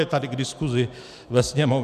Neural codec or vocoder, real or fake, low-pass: none; real; 14.4 kHz